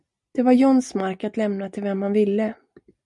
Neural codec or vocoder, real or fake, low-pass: none; real; 10.8 kHz